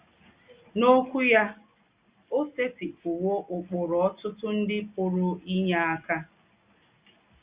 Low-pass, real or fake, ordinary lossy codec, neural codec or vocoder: 3.6 kHz; real; Opus, 64 kbps; none